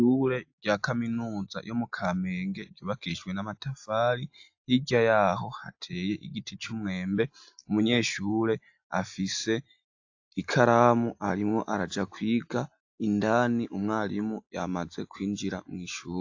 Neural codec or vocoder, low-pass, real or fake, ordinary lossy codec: none; 7.2 kHz; real; AAC, 48 kbps